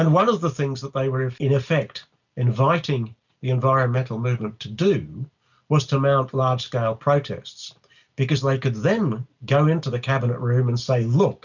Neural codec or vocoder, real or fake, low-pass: none; real; 7.2 kHz